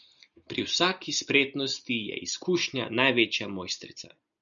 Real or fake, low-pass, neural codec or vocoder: real; 7.2 kHz; none